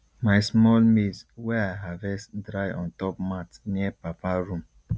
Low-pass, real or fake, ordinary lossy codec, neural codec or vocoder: none; real; none; none